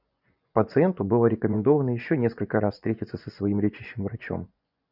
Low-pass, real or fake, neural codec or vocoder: 5.4 kHz; fake; vocoder, 24 kHz, 100 mel bands, Vocos